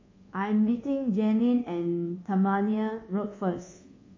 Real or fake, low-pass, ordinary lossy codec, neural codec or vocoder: fake; 7.2 kHz; MP3, 32 kbps; codec, 24 kHz, 1.2 kbps, DualCodec